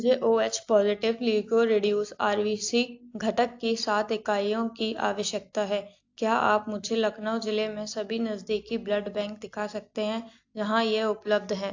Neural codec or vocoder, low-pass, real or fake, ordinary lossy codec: none; 7.2 kHz; real; AAC, 48 kbps